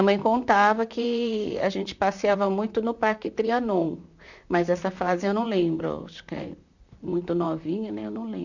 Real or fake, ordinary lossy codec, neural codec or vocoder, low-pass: fake; none; vocoder, 44.1 kHz, 128 mel bands, Pupu-Vocoder; 7.2 kHz